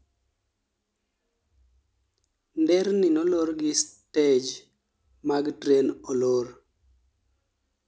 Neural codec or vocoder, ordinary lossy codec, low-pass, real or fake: none; none; none; real